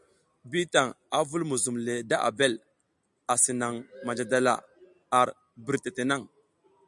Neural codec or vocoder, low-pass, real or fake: none; 10.8 kHz; real